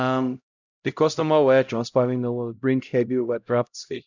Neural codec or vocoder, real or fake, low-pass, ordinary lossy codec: codec, 16 kHz, 0.5 kbps, X-Codec, HuBERT features, trained on LibriSpeech; fake; 7.2 kHz; none